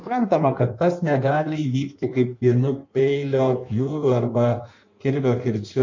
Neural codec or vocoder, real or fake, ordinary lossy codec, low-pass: codec, 16 kHz in and 24 kHz out, 1.1 kbps, FireRedTTS-2 codec; fake; MP3, 48 kbps; 7.2 kHz